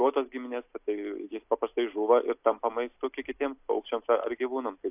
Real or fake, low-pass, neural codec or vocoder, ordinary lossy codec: real; 3.6 kHz; none; AAC, 32 kbps